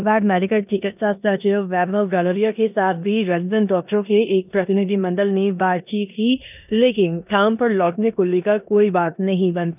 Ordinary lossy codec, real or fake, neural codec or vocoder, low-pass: none; fake; codec, 16 kHz in and 24 kHz out, 0.9 kbps, LongCat-Audio-Codec, four codebook decoder; 3.6 kHz